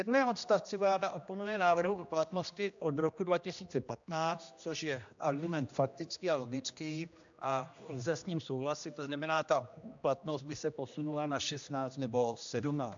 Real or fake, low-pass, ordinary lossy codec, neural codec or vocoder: fake; 7.2 kHz; AAC, 64 kbps; codec, 16 kHz, 1 kbps, X-Codec, HuBERT features, trained on general audio